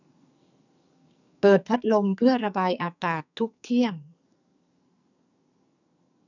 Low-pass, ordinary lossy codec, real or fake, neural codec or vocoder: 7.2 kHz; none; fake; codec, 44.1 kHz, 2.6 kbps, SNAC